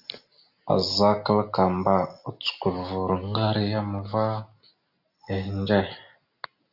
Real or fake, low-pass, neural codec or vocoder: real; 5.4 kHz; none